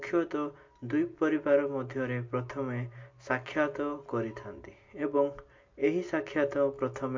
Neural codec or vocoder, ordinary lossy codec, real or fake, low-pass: none; MP3, 48 kbps; real; 7.2 kHz